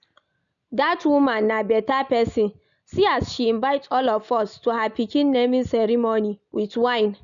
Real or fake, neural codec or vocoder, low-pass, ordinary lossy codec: real; none; 7.2 kHz; none